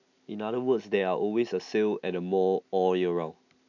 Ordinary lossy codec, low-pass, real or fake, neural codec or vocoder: none; 7.2 kHz; real; none